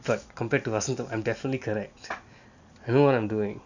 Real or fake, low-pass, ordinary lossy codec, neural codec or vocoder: real; 7.2 kHz; none; none